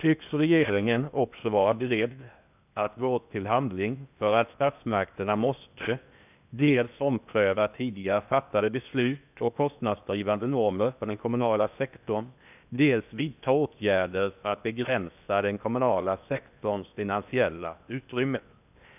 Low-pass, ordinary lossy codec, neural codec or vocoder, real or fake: 3.6 kHz; none; codec, 16 kHz in and 24 kHz out, 0.8 kbps, FocalCodec, streaming, 65536 codes; fake